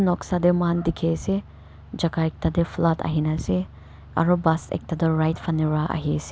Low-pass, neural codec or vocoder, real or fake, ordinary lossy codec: none; none; real; none